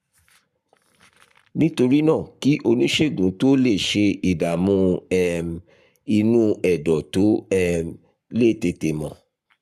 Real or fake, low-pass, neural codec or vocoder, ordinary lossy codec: fake; 14.4 kHz; codec, 44.1 kHz, 7.8 kbps, Pupu-Codec; none